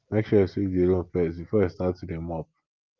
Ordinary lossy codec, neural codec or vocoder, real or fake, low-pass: Opus, 24 kbps; none; real; 7.2 kHz